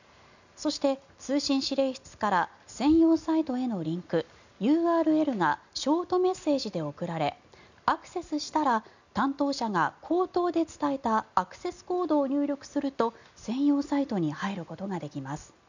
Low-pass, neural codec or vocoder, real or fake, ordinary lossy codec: 7.2 kHz; none; real; none